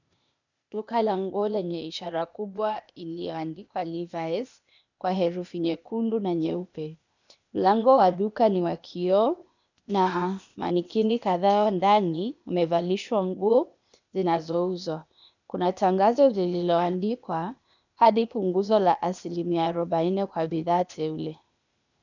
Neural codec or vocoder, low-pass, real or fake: codec, 16 kHz, 0.8 kbps, ZipCodec; 7.2 kHz; fake